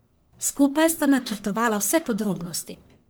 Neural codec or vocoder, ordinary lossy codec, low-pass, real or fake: codec, 44.1 kHz, 1.7 kbps, Pupu-Codec; none; none; fake